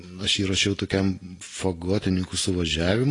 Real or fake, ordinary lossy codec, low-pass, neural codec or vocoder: real; AAC, 32 kbps; 10.8 kHz; none